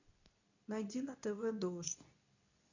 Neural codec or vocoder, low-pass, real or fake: codec, 24 kHz, 0.9 kbps, WavTokenizer, medium speech release version 2; 7.2 kHz; fake